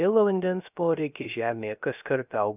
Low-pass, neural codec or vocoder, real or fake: 3.6 kHz; codec, 16 kHz, 0.3 kbps, FocalCodec; fake